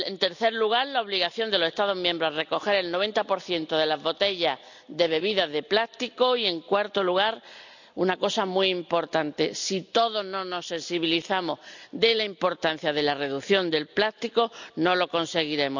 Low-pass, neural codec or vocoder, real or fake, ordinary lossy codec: 7.2 kHz; none; real; none